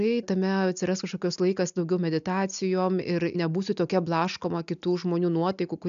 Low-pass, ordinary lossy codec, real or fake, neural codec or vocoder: 7.2 kHz; AAC, 96 kbps; real; none